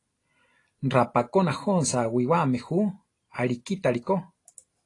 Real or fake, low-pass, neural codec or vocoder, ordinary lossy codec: real; 10.8 kHz; none; AAC, 32 kbps